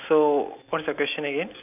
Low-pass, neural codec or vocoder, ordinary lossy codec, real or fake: 3.6 kHz; none; none; real